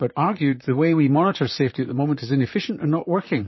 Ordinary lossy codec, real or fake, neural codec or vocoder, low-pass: MP3, 24 kbps; fake; vocoder, 44.1 kHz, 128 mel bands, Pupu-Vocoder; 7.2 kHz